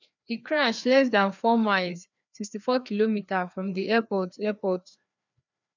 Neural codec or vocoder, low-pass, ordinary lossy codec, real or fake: codec, 16 kHz, 2 kbps, FreqCodec, larger model; 7.2 kHz; none; fake